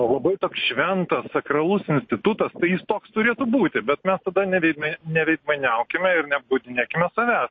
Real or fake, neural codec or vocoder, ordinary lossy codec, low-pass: real; none; MP3, 32 kbps; 7.2 kHz